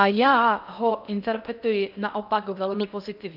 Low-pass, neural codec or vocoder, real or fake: 5.4 kHz; codec, 16 kHz in and 24 kHz out, 0.6 kbps, FocalCodec, streaming, 2048 codes; fake